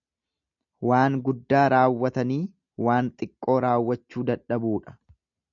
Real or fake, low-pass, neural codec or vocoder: real; 7.2 kHz; none